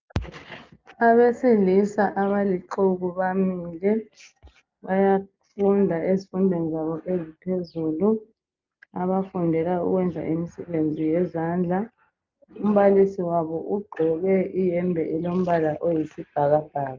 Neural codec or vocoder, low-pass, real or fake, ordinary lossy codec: none; 7.2 kHz; real; Opus, 24 kbps